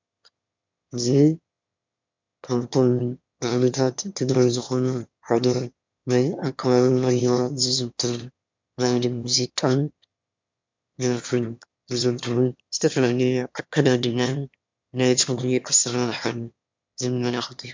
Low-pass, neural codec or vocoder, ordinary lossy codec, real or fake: 7.2 kHz; autoencoder, 22.05 kHz, a latent of 192 numbers a frame, VITS, trained on one speaker; MP3, 64 kbps; fake